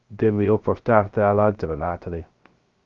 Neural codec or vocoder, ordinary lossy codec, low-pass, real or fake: codec, 16 kHz, 0.3 kbps, FocalCodec; Opus, 32 kbps; 7.2 kHz; fake